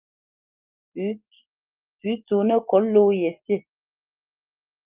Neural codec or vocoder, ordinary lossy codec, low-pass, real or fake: none; Opus, 32 kbps; 3.6 kHz; real